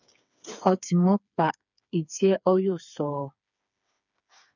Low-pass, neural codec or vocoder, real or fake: 7.2 kHz; codec, 16 kHz, 4 kbps, FreqCodec, smaller model; fake